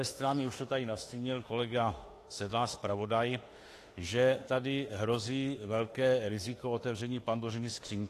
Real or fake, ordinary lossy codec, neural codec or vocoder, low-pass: fake; AAC, 48 kbps; autoencoder, 48 kHz, 32 numbers a frame, DAC-VAE, trained on Japanese speech; 14.4 kHz